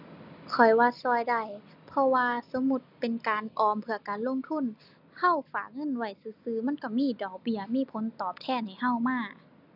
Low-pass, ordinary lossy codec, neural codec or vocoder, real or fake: 5.4 kHz; none; none; real